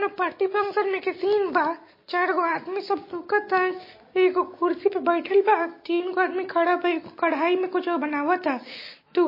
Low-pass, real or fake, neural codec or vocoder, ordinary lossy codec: 5.4 kHz; real; none; MP3, 24 kbps